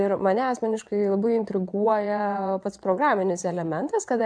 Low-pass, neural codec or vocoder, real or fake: 9.9 kHz; vocoder, 22.05 kHz, 80 mel bands, WaveNeXt; fake